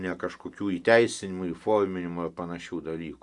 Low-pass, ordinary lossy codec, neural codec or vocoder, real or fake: 10.8 kHz; Opus, 64 kbps; none; real